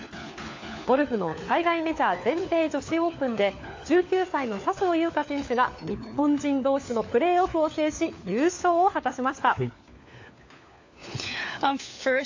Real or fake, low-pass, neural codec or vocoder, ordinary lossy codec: fake; 7.2 kHz; codec, 16 kHz, 4 kbps, FunCodec, trained on LibriTTS, 50 frames a second; AAC, 48 kbps